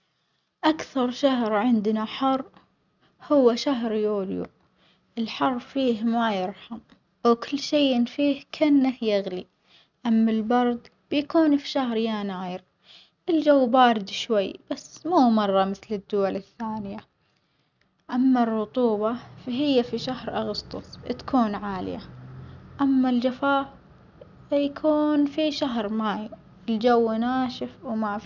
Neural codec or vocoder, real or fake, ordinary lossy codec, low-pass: none; real; none; 7.2 kHz